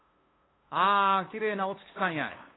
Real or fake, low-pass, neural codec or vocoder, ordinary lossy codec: fake; 7.2 kHz; codec, 16 kHz, 2 kbps, FunCodec, trained on LibriTTS, 25 frames a second; AAC, 16 kbps